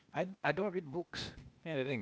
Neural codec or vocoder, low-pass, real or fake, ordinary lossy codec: codec, 16 kHz, 0.8 kbps, ZipCodec; none; fake; none